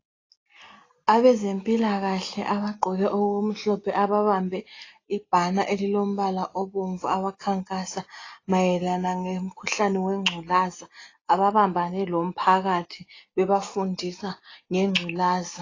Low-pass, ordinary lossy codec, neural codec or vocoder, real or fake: 7.2 kHz; AAC, 32 kbps; none; real